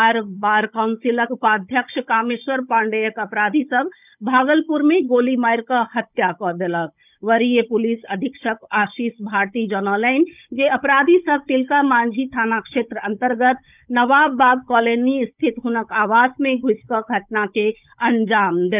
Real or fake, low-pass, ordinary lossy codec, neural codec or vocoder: fake; 3.6 kHz; none; codec, 16 kHz, 16 kbps, FunCodec, trained on Chinese and English, 50 frames a second